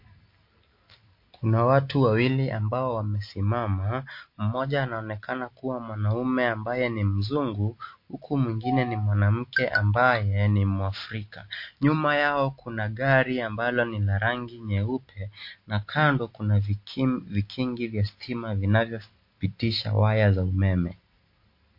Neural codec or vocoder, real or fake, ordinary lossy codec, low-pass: none; real; MP3, 32 kbps; 5.4 kHz